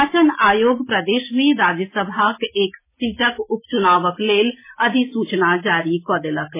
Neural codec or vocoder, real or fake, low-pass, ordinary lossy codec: none; real; 3.6 kHz; MP3, 16 kbps